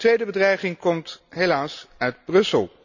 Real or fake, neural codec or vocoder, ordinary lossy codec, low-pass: real; none; none; 7.2 kHz